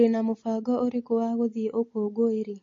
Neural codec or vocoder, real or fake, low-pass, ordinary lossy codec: none; real; 7.2 kHz; MP3, 32 kbps